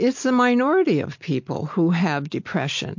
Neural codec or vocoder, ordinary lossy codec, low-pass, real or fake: none; MP3, 48 kbps; 7.2 kHz; real